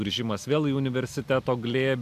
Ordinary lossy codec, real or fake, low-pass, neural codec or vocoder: AAC, 96 kbps; real; 14.4 kHz; none